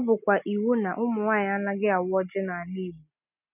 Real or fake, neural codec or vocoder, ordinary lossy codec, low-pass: real; none; none; 3.6 kHz